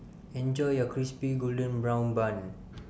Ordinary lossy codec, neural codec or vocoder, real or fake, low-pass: none; none; real; none